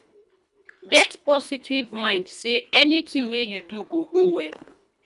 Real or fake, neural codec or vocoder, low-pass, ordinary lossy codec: fake; codec, 24 kHz, 1.5 kbps, HILCodec; 10.8 kHz; none